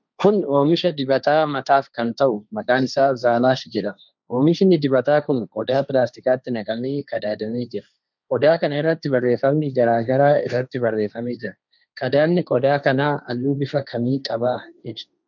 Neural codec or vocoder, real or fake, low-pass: codec, 16 kHz, 1.1 kbps, Voila-Tokenizer; fake; 7.2 kHz